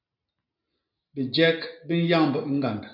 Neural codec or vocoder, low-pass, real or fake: none; 5.4 kHz; real